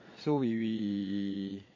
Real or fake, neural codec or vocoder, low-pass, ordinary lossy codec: fake; vocoder, 22.05 kHz, 80 mel bands, Vocos; 7.2 kHz; MP3, 32 kbps